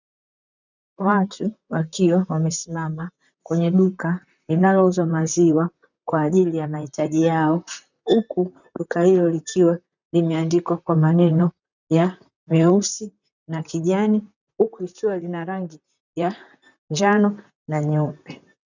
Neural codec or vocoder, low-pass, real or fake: vocoder, 44.1 kHz, 128 mel bands, Pupu-Vocoder; 7.2 kHz; fake